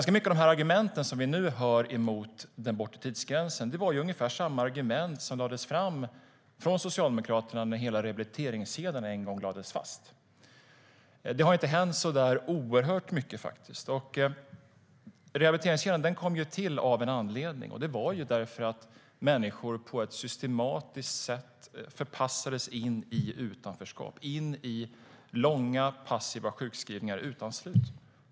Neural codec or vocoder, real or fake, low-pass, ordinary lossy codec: none; real; none; none